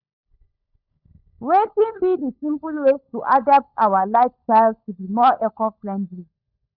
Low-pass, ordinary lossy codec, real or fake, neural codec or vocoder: 5.4 kHz; none; fake; codec, 16 kHz, 16 kbps, FunCodec, trained on LibriTTS, 50 frames a second